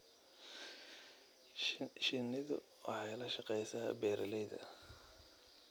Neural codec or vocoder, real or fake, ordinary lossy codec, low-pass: none; real; none; none